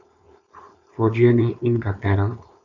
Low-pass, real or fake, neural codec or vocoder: 7.2 kHz; fake; codec, 16 kHz, 4.8 kbps, FACodec